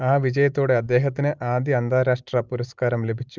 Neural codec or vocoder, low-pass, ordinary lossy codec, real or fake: none; 7.2 kHz; Opus, 32 kbps; real